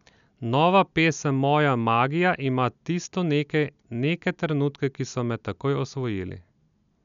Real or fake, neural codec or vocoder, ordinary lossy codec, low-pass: real; none; none; 7.2 kHz